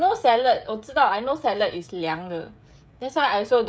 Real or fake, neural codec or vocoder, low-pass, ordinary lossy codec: fake; codec, 16 kHz, 16 kbps, FreqCodec, smaller model; none; none